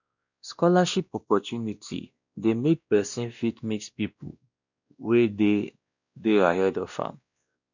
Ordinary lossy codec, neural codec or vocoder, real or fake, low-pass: none; codec, 16 kHz, 1 kbps, X-Codec, WavLM features, trained on Multilingual LibriSpeech; fake; 7.2 kHz